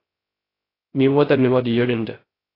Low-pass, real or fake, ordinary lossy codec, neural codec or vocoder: 5.4 kHz; fake; AAC, 24 kbps; codec, 16 kHz, 0.3 kbps, FocalCodec